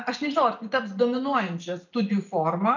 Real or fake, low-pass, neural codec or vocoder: real; 7.2 kHz; none